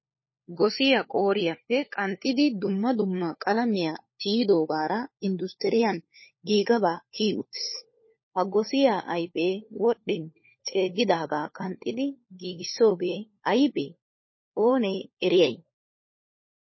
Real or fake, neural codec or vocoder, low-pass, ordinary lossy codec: fake; codec, 16 kHz, 4 kbps, FunCodec, trained on LibriTTS, 50 frames a second; 7.2 kHz; MP3, 24 kbps